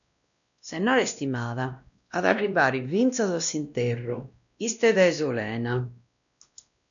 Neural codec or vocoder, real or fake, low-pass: codec, 16 kHz, 1 kbps, X-Codec, WavLM features, trained on Multilingual LibriSpeech; fake; 7.2 kHz